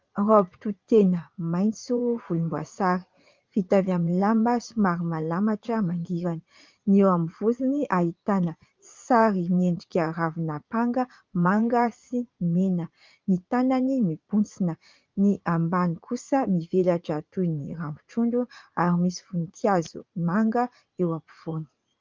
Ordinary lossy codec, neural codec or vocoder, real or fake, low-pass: Opus, 32 kbps; vocoder, 44.1 kHz, 80 mel bands, Vocos; fake; 7.2 kHz